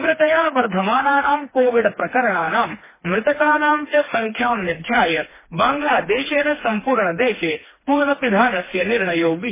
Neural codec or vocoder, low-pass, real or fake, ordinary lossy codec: codec, 16 kHz, 2 kbps, FreqCodec, smaller model; 3.6 kHz; fake; MP3, 16 kbps